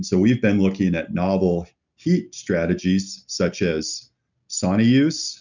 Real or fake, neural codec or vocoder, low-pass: real; none; 7.2 kHz